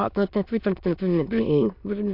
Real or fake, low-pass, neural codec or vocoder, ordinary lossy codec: fake; 5.4 kHz; autoencoder, 22.05 kHz, a latent of 192 numbers a frame, VITS, trained on many speakers; MP3, 48 kbps